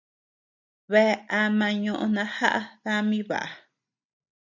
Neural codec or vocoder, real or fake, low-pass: none; real; 7.2 kHz